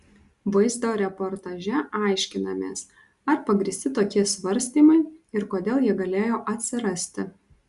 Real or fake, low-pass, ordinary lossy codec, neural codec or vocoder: real; 10.8 kHz; Opus, 64 kbps; none